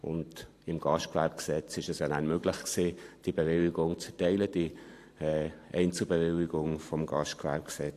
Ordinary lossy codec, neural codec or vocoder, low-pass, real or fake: AAC, 64 kbps; vocoder, 44.1 kHz, 128 mel bands every 512 samples, BigVGAN v2; 14.4 kHz; fake